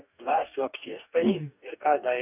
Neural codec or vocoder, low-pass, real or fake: codec, 44.1 kHz, 2.6 kbps, DAC; 3.6 kHz; fake